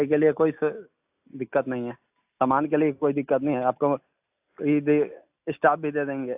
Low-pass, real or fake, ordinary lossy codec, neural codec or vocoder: 3.6 kHz; real; AAC, 32 kbps; none